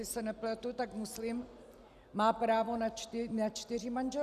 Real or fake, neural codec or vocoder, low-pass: real; none; 14.4 kHz